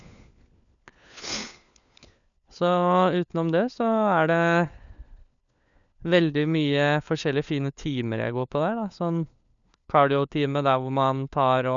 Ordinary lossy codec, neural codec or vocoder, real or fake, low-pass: none; codec, 16 kHz, 4 kbps, FunCodec, trained on LibriTTS, 50 frames a second; fake; 7.2 kHz